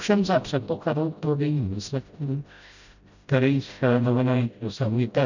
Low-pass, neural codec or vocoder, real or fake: 7.2 kHz; codec, 16 kHz, 0.5 kbps, FreqCodec, smaller model; fake